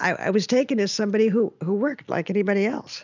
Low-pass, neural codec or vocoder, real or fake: 7.2 kHz; none; real